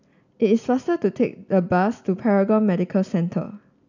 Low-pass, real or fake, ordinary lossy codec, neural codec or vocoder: 7.2 kHz; real; none; none